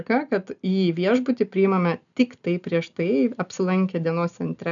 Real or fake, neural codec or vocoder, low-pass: real; none; 7.2 kHz